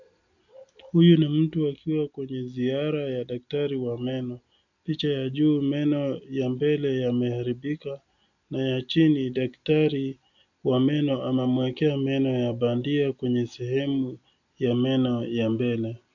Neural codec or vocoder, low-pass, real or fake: none; 7.2 kHz; real